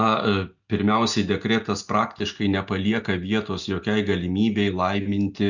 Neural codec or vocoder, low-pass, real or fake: none; 7.2 kHz; real